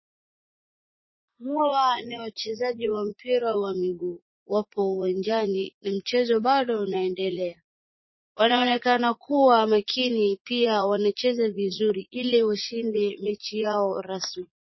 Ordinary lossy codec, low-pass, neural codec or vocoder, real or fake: MP3, 24 kbps; 7.2 kHz; vocoder, 44.1 kHz, 80 mel bands, Vocos; fake